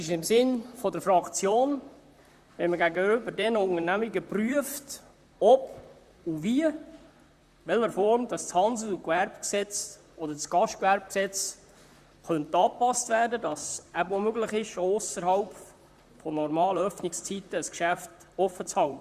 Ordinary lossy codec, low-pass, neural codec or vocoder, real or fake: Opus, 64 kbps; 14.4 kHz; vocoder, 44.1 kHz, 128 mel bands, Pupu-Vocoder; fake